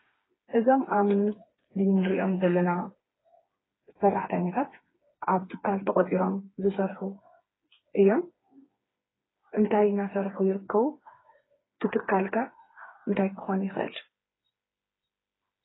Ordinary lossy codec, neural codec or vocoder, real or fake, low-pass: AAC, 16 kbps; codec, 16 kHz, 4 kbps, FreqCodec, smaller model; fake; 7.2 kHz